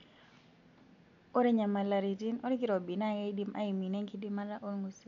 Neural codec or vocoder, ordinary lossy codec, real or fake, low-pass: none; MP3, 96 kbps; real; 7.2 kHz